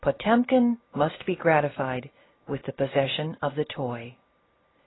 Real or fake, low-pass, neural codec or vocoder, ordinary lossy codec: real; 7.2 kHz; none; AAC, 16 kbps